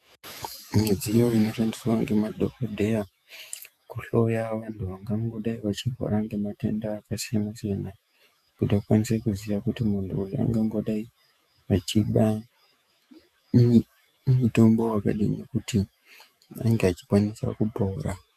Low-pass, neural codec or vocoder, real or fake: 14.4 kHz; autoencoder, 48 kHz, 128 numbers a frame, DAC-VAE, trained on Japanese speech; fake